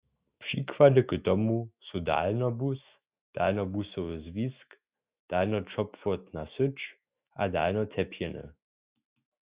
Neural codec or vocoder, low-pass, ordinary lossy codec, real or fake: autoencoder, 48 kHz, 128 numbers a frame, DAC-VAE, trained on Japanese speech; 3.6 kHz; Opus, 64 kbps; fake